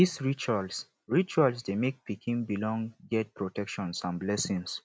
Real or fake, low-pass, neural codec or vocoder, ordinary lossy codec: real; none; none; none